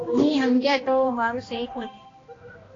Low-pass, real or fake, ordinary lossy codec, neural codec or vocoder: 7.2 kHz; fake; AAC, 32 kbps; codec, 16 kHz, 1 kbps, X-Codec, HuBERT features, trained on balanced general audio